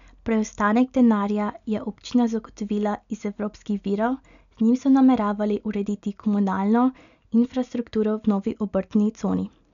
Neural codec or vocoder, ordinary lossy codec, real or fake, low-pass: none; none; real; 7.2 kHz